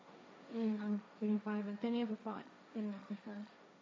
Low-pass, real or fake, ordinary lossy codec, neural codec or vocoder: none; fake; none; codec, 16 kHz, 1.1 kbps, Voila-Tokenizer